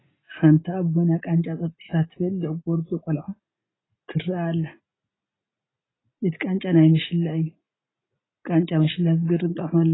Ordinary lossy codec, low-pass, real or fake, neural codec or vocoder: AAC, 16 kbps; 7.2 kHz; real; none